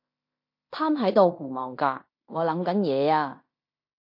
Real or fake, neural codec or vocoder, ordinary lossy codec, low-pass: fake; codec, 16 kHz in and 24 kHz out, 0.9 kbps, LongCat-Audio-Codec, fine tuned four codebook decoder; MP3, 32 kbps; 5.4 kHz